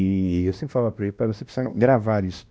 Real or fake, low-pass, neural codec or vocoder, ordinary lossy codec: fake; none; codec, 16 kHz, 1 kbps, X-Codec, WavLM features, trained on Multilingual LibriSpeech; none